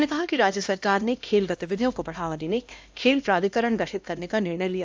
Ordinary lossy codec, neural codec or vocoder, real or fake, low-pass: none; codec, 16 kHz, 1 kbps, X-Codec, HuBERT features, trained on LibriSpeech; fake; none